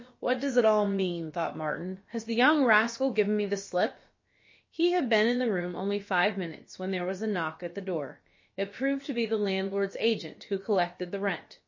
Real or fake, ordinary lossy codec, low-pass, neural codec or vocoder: fake; MP3, 32 kbps; 7.2 kHz; codec, 16 kHz, about 1 kbps, DyCAST, with the encoder's durations